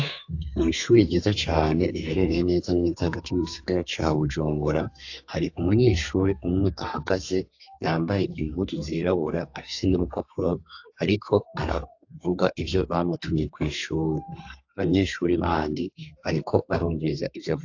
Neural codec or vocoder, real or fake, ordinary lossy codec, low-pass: codec, 32 kHz, 1.9 kbps, SNAC; fake; AAC, 48 kbps; 7.2 kHz